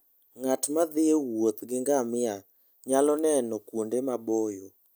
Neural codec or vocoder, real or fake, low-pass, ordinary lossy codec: none; real; none; none